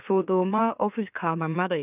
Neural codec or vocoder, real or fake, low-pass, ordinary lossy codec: autoencoder, 44.1 kHz, a latent of 192 numbers a frame, MeloTTS; fake; 3.6 kHz; none